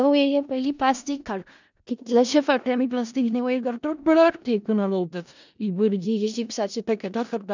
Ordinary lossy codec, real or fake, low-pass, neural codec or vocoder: none; fake; 7.2 kHz; codec, 16 kHz in and 24 kHz out, 0.4 kbps, LongCat-Audio-Codec, four codebook decoder